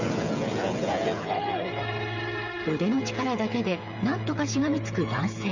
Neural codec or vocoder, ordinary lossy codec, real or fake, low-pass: codec, 16 kHz, 8 kbps, FreqCodec, smaller model; none; fake; 7.2 kHz